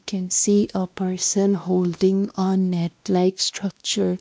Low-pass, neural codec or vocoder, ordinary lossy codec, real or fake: none; codec, 16 kHz, 1 kbps, X-Codec, WavLM features, trained on Multilingual LibriSpeech; none; fake